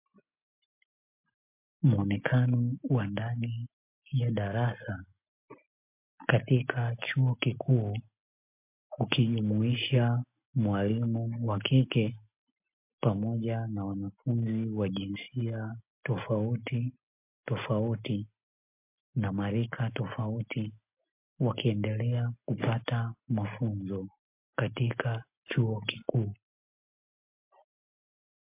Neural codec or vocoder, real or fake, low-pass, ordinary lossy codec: none; real; 3.6 kHz; MP3, 32 kbps